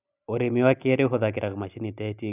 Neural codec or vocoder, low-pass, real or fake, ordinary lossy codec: none; 3.6 kHz; real; none